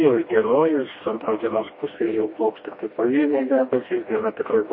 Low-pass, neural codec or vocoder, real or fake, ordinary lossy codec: 5.4 kHz; codec, 16 kHz, 1 kbps, FreqCodec, smaller model; fake; MP3, 24 kbps